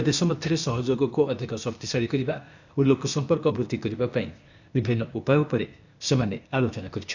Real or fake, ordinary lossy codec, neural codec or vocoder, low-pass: fake; none; codec, 16 kHz, 0.8 kbps, ZipCodec; 7.2 kHz